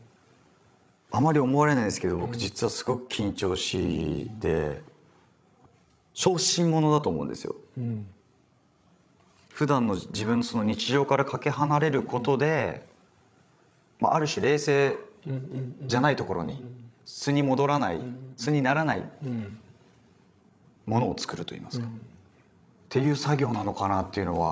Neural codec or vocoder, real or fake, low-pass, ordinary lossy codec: codec, 16 kHz, 16 kbps, FreqCodec, larger model; fake; none; none